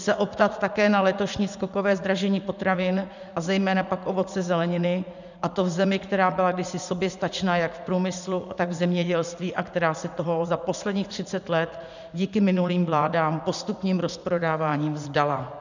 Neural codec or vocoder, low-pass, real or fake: vocoder, 44.1 kHz, 80 mel bands, Vocos; 7.2 kHz; fake